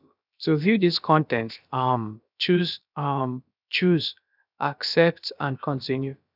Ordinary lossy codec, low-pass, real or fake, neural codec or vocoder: none; 5.4 kHz; fake; codec, 16 kHz, about 1 kbps, DyCAST, with the encoder's durations